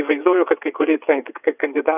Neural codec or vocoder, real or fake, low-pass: vocoder, 22.05 kHz, 80 mel bands, WaveNeXt; fake; 3.6 kHz